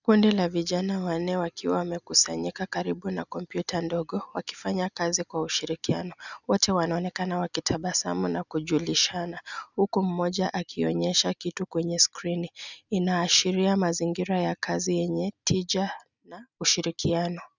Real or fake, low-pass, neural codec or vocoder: real; 7.2 kHz; none